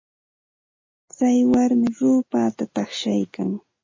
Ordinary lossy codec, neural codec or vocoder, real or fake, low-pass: MP3, 32 kbps; none; real; 7.2 kHz